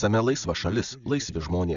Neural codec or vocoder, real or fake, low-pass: none; real; 7.2 kHz